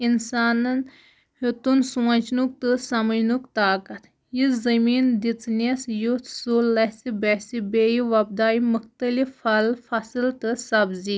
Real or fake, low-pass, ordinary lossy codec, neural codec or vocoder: real; none; none; none